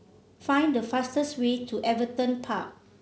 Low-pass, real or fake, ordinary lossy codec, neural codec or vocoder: none; real; none; none